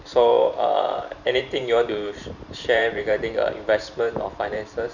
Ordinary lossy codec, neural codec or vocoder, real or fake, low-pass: none; vocoder, 44.1 kHz, 128 mel bands every 512 samples, BigVGAN v2; fake; 7.2 kHz